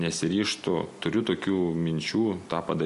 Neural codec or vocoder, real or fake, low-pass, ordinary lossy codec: none; real; 14.4 kHz; MP3, 48 kbps